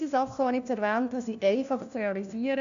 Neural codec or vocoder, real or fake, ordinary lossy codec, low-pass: codec, 16 kHz, 1 kbps, FunCodec, trained on LibriTTS, 50 frames a second; fake; none; 7.2 kHz